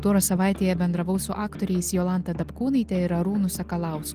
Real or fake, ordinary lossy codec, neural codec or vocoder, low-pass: real; Opus, 24 kbps; none; 14.4 kHz